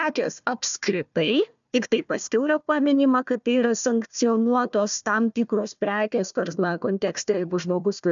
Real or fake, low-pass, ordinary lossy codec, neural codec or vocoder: fake; 7.2 kHz; MP3, 96 kbps; codec, 16 kHz, 1 kbps, FunCodec, trained on Chinese and English, 50 frames a second